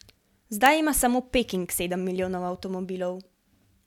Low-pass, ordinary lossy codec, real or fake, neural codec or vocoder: 19.8 kHz; none; real; none